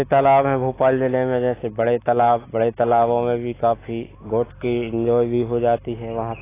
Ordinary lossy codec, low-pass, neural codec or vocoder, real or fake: AAC, 16 kbps; 3.6 kHz; none; real